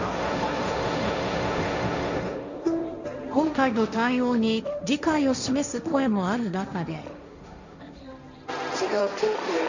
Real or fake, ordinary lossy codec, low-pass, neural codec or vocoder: fake; none; 7.2 kHz; codec, 16 kHz, 1.1 kbps, Voila-Tokenizer